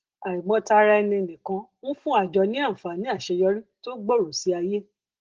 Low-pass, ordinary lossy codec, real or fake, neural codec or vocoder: 7.2 kHz; Opus, 16 kbps; real; none